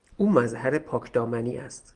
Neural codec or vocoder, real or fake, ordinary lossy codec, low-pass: none; real; Opus, 24 kbps; 9.9 kHz